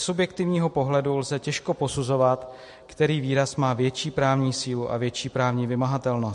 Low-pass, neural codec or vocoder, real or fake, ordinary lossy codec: 14.4 kHz; none; real; MP3, 48 kbps